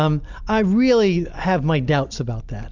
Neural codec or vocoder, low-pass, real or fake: none; 7.2 kHz; real